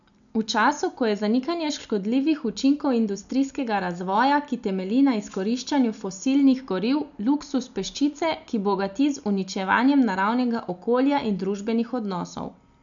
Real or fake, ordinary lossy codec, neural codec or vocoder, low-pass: real; none; none; 7.2 kHz